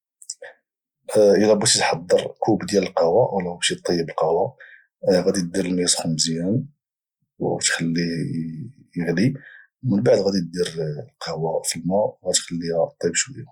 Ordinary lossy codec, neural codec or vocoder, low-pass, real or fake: Opus, 64 kbps; none; 19.8 kHz; real